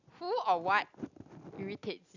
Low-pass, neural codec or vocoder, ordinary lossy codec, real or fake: 7.2 kHz; none; none; real